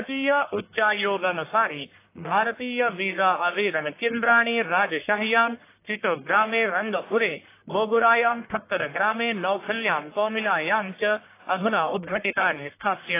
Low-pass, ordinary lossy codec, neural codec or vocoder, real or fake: 3.6 kHz; AAC, 24 kbps; codec, 44.1 kHz, 1.7 kbps, Pupu-Codec; fake